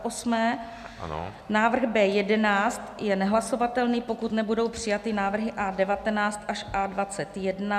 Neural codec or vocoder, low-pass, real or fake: none; 14.4 kHz; real